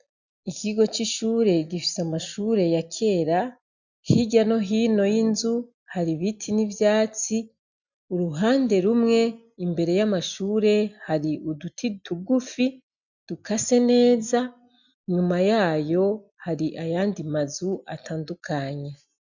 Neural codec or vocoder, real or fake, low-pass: none; real; 7.2 kHz